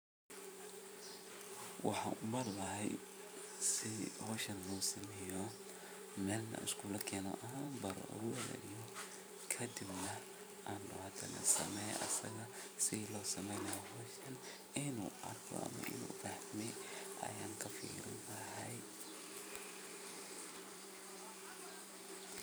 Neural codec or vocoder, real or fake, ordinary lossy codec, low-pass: vocoder, 44.1 kHz, 128 mel bands every 512 samples, BigVGAN v2; fake; none; none